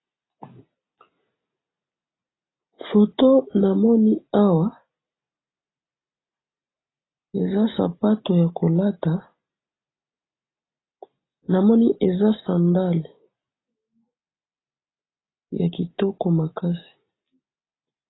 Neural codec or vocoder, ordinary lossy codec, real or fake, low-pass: none; AAC, 16 kbps; real; 7.2 kHz